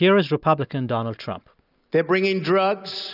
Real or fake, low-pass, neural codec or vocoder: real; 5.4 kHz; none